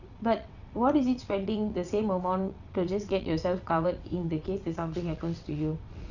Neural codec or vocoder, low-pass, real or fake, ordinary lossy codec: vocoder, 22.05 kHz, 80 mel bands, WaveNeXt; 7.2 kHz; fake; none